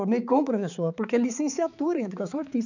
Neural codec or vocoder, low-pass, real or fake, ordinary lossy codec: codec, 16 kHz, 4 kbps, X-Codec, HuBERT features, trained on balanced general audio; 7.2 kHz; fake; none